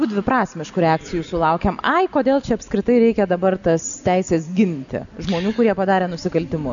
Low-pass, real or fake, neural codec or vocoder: 7.2 kHz; real; none